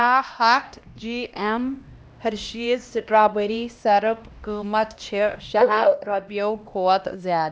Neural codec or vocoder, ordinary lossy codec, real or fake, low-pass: codec, 16 kHz, 1 kbps, X-Codec, HuBERT features, trained on LibriSpeech; none; fake; none